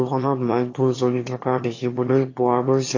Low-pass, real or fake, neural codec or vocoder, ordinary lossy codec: 7.2 kHz; fake; autoencoder, 22.05 kHz, a latent of 192 numbers a frame, VITS, trained on one speaker; AAC, 32 kbps